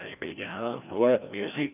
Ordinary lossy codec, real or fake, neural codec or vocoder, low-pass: none; fake; codec, 16 kHz, 1 kbps, FreqCodec, larger model; 3.6 kHz